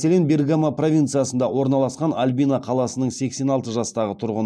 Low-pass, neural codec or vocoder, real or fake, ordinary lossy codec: none; none; real; none